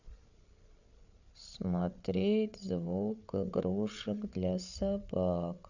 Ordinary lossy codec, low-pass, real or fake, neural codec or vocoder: none; 7.2 kHz; fake; codec, 16 kHz, 8 kbps, FreqCodec, larger model